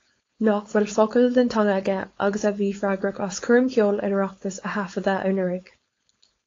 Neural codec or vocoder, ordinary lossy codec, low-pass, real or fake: codec, 16 kHz, 4.8 kbps, FACodec; AAC, 32 kbps; 7.2 kHz; fake